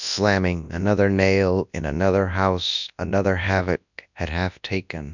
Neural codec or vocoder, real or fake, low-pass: codec, 24 kHz, 0.9 kbps, WavTokenizer, large speech release; fake; 7.2 kHz